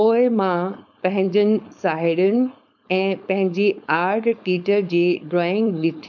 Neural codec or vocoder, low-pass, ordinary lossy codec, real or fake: codec, 16 kHz, 4.8 kbps, FACodec; 7.2 kHz; none; fake